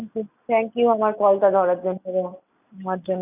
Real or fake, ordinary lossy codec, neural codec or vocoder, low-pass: real; none; none; 3.6 kHz